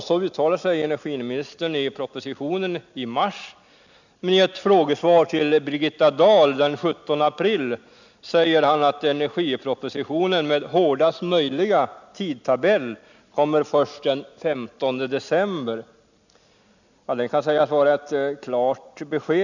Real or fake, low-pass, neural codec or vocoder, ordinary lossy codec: real; 7.2 kHz; none; none